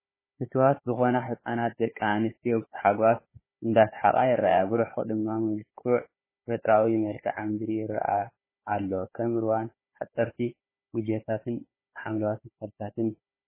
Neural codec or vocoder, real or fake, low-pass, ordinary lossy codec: codec, 16 kHz, 4 kbps, FunCodec, trained on Chinese and English, 50 frames a second; fake; 3.6 kHz; MP3, 16 kbps